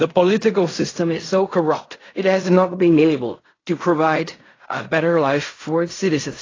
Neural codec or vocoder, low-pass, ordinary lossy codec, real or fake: codec, 16 kHz in and 24 kHz out, 0.4 kbps, LongCat-Audio-Codec, fine tuned four codebook decoder; 7.2 kHz; AAC, 32 kbps; fake